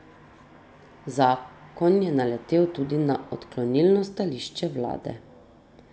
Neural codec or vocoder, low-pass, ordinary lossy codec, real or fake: none; none; none; real